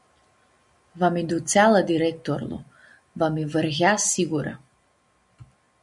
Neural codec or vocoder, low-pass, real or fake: none; 10.8 kHz; real